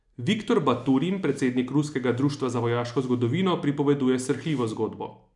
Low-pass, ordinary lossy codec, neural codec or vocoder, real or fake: 10.8 kHz; none; none; real